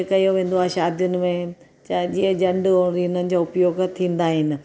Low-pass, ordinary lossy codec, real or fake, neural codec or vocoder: none; none; real; none